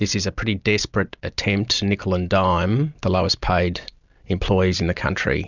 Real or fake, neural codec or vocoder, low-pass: real; none; 7.2 kHz